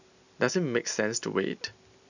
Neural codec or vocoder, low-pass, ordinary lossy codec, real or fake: none; 7.2 kHz; none; real